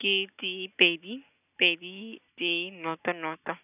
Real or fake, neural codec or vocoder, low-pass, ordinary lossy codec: real; none; 3.6 kHz; none